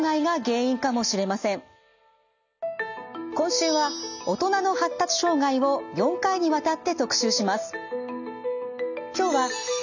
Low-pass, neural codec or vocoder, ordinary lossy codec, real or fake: 7.2 kHz; none; none; real